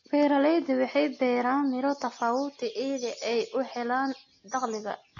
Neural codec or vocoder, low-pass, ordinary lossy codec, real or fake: none; 7.2 kHz; AAC, 32 kbps; real